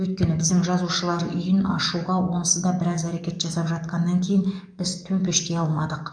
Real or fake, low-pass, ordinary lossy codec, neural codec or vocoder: fake; 9.9 kHz; none; codec, 44.1 kHz, 7.8 kbps, DAC